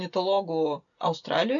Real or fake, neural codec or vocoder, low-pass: real; none; 7.2 kHz